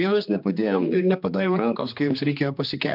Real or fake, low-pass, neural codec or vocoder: fake; 5.4 kHz; codec, 16 kHz, 2 kbps, X-Codec, HuBERT features, trained on general audio